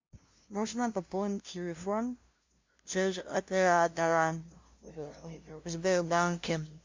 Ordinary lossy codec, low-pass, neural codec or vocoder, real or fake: MP3, 48 kbps; 7.2 kHz; codec, 16 kHz, 0.5 kbps, FunCodec, trained on LibriTTS, 25 frames a second; fake